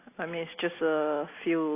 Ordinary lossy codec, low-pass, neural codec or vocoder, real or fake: AAC, 24 kbps; 3.6 kHz; none; real